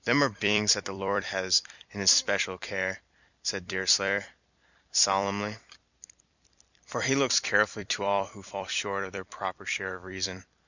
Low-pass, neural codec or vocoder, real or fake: 7.2 kHz; vocoder, 44.1 kHz, 128 mel bands every 256 samples, BigVGAN v2; fake